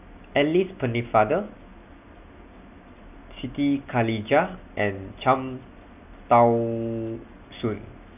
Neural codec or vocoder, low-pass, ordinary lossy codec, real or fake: none; 3.6 kHz; none; real